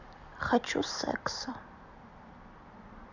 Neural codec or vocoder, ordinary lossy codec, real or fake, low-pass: vocoder, 44.1 kHz, 128 mel bands every 256 samples, BigVGAN v2; none; fake; 7.2 kHz